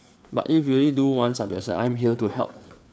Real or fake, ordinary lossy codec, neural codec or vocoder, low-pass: fake; none; codec, 16 kHz, 4 kbps, FreqCodec, larger model; none